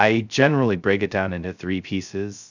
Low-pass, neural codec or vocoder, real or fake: 7.2 kHz; codec, 16 kHz, 0.2 kbps, FocalCodec; fake